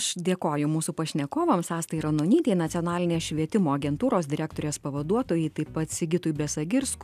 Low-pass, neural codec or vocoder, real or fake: 14.4 kHz; none; real